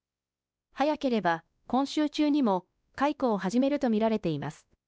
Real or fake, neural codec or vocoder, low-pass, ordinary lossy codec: fake; codec, 16 kHz, 2 kbps, X-Codec, WavLM features, trained on Multilingual LibriSpeech; none; none